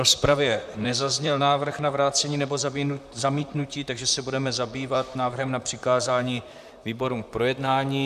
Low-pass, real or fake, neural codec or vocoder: 14.4 kHz; fake; vocoder, 44.1 kHz, 128 mel bands, Pupu-Vocoder